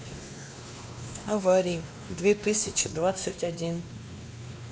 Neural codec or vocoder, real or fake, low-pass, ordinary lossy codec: codec, 16 kHz, 2 kbps, X-Codec, WavLM features, trained on Multilingual LibriSpeech; fake; none; none